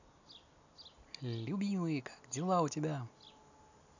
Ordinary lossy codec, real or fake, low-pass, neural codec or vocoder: none; real; 7.2 kHz; none